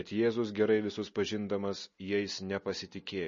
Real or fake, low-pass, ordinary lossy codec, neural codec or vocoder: real; 7.2 kHz; MP3, 32 kbps; none